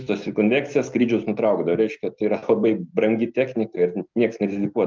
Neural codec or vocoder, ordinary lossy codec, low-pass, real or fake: none; Opus, 24 kbps; 7.2 kHz; real